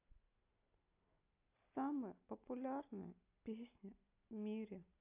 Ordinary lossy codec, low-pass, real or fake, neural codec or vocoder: AAC, 32 kbps; 3.6 kHz; real; none